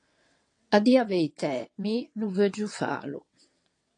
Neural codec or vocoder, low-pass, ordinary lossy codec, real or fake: vocoder, 22.05 kHz, 80 mel bands, WaveNeXt; 9.9 kHz; AAC, 48 kbps; fake